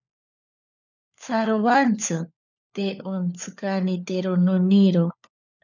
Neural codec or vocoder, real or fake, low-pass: codec, 16 kHz, 4 kbps, FunCodec, trained on LibriTTS, 50 frames a second; fake; 7.2 kHz